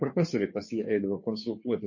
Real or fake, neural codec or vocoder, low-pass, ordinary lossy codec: fake; codec, 16 kHz, 2 kbps, FunCodec, trained on Chinese and English, 25 frames a second; 7.2 kHz; MP3, 32 kbps